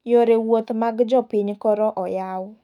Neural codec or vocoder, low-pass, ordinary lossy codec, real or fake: autoencoder, 48 kHz, 128 numbers a frame, DAC-VAE, trained on Japanese speech; 19.8 kHz; none; fake